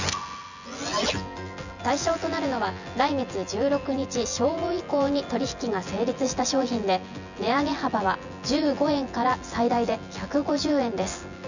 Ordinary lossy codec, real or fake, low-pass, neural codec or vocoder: none; fake; 7.2 kHz; vocoder, 24 kHz, 100 mel bands, Vocos